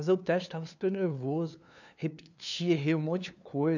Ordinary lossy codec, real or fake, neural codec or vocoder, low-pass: none; fake; codec, 16 kHz, 2 kbps, FunCodec, trained on LibriTTS, 25 frames a second; 7.2 kHz